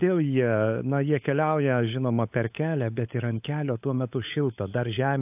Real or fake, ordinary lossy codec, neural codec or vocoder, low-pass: fake; AAC, 32 kbps; codec, 16 kHz, 16 kbps, FunCodec, trained on LibriTTS, 50 frames a second; 3.6 kHz